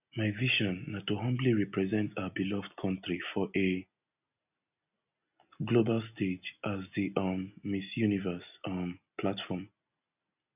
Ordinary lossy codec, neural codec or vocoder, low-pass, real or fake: none; none; 3.6 kHz; real